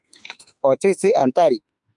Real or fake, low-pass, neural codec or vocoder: fake; 10.8 kHz; autoencoder, 48 kHz, 32 numbers a frame, DAC-VAE, trained on Japanese speech